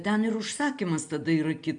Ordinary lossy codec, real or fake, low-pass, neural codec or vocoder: MP3, 96 kbps; real; 9.9 kHz; none